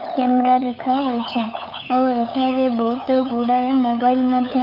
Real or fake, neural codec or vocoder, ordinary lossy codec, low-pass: fake; codec, 16 kHz, 8 kbps, FunCodec, trained on LibriTTS, 25 frames a second; none; 5.4 kHz